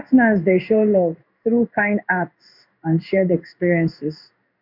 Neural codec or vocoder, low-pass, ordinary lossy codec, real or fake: codec, 16 kHz in and 24 kHz out, 1 kbps, XY-Tokenizer; 5.4 kHz; MP3, 48 kbps; fake